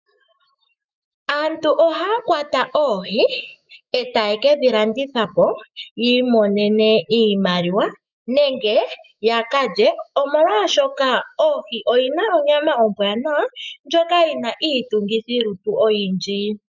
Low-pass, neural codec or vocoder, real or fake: 7.2 kHz; autoencoder, 48 kHz, 128 numbers a frame, DAC-VAE, trained on Japanese speech; fake